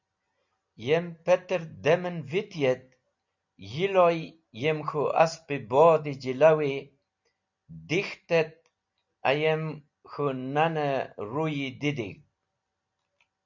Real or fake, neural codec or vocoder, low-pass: real; none; 7.2 kHz